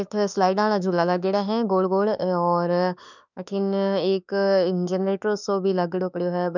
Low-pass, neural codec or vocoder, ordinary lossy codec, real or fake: 7.2 kHz; autoencoder, 48 kHz, 32 numbers a frame, DAC-VAE, trained on Japanese speech; none; fake